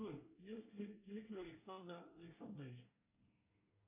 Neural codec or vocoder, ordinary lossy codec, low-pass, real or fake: codec, 24 kHz, 1 kbps, SNAC; AAC, 32 kbps; 3.6 kHz; fake